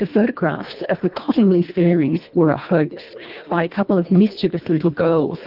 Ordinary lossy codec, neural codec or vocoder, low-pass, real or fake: Opus, 32 kbps; codec, 24 kHz, 1.5 kbps, HILCodec; 5.4 kHz; fake